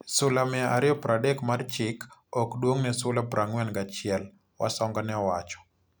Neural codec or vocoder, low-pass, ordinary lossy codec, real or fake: none; none; none; real